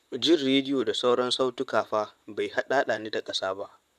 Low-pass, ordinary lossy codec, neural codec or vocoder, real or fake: 14.4 kHz; none; vocoder, 44.1 kHz, 128 mel bands, Pupu-Vocoder; fake